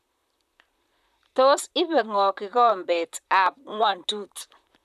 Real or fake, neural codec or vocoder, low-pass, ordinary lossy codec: fake; vocoder, 44.1 kHz, 128 mel bands, Pupu-Vocoder; 14.4 kHz; none